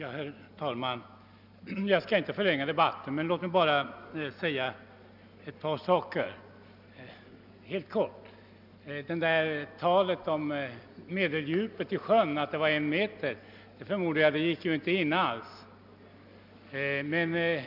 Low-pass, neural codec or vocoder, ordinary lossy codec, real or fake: 5.4 kHz; none; none; real